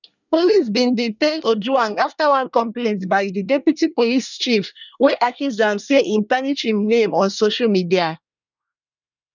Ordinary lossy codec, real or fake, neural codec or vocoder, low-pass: none; fake; codec, 24 kHz, 1 kbps, SNAC; 7.2 kHz